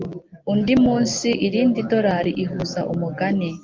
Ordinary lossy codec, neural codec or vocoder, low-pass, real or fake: Opus, 32 kbps; none; 7.2 kHz; real